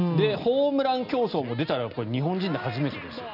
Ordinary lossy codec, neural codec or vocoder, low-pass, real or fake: none; none; 5.4 kHz; real